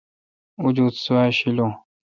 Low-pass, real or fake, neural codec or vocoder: 7.2 kHz; real; none